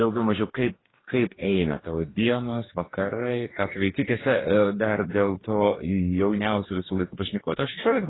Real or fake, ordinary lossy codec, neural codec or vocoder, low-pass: fake; AAC, 16 kbps; codec, 44.1 kHz, 2.6 kbps, DAC; 7.2 kHz